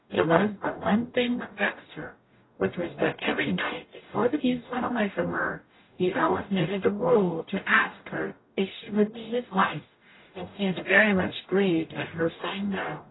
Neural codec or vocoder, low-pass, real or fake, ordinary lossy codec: codec, 44.1 kHz, 0.9 kbps, DAC; 7.2 kHz; fake; AAC, 16 kbps